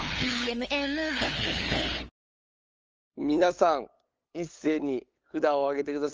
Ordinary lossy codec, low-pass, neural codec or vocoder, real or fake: Opus, 24 kbps; 7.2 kHz; codec, 16 kHz, 8 kbps, FunCodec, trained on LibriTTS, 25 frames a second; fake